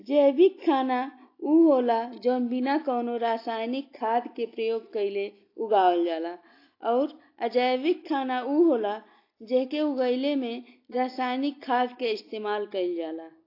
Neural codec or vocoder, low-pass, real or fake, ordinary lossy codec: none; 5.4 kHz; real; AAC, 32 kbps